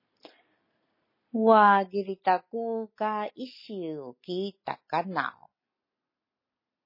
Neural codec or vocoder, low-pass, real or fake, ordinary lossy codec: none; 5.4 kHz; real; MP3, 24 kbps